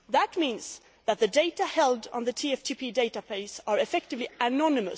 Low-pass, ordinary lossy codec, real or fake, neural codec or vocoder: none; none; real; none